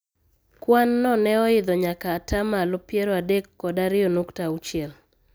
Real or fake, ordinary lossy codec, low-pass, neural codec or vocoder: real; none; none; none